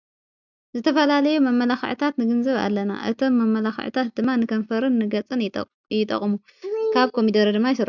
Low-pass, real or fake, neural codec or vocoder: 7.2 kHz; real; none